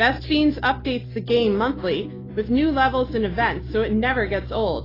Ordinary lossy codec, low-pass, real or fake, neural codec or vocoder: AAC, 24 kbps; 5.4 kHz; real; none